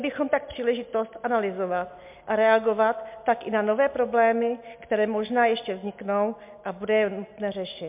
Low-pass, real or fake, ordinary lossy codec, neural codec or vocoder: 3.6 kHz; real; MP3, 32 kbps; none